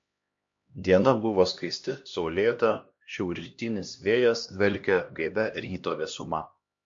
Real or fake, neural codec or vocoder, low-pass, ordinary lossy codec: fake; codec, 16 kHz, 1 kbps, X-Codec, HuBERT features, trained on LibriSpeech; 7.2 kHz; MP3, 48 kbps